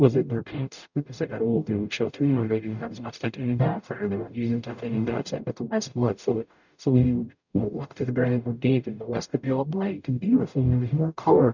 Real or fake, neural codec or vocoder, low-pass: fake; codec, 44.1 kHz, 0.9 kbps, DAC; 7.2 kHz